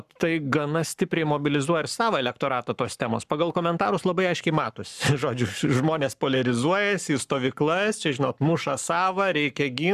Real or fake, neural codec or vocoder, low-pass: fake; codec, 44.1 kHz, 7.8 kbps, Pupu-Codec; 14.4 kHz